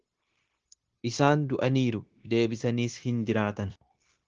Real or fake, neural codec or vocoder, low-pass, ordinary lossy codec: fake; codec, 16 kHz, 0.9 kbps, LongCat-Audio-Codec; 7.2 kHz; Opus, 16 kbps